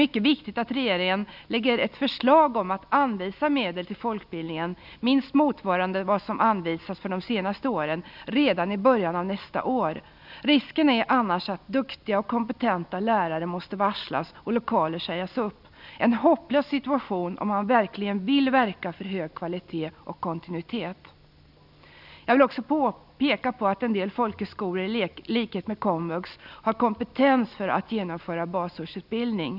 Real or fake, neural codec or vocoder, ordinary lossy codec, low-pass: real; none; none; 5.4 kHz